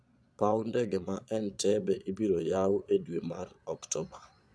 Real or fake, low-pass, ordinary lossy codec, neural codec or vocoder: fake; none; none; vocoder, 22.05 kHz, 80 mel bands, Vocos